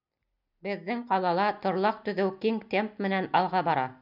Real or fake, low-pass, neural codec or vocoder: real; 5.4 kHz; none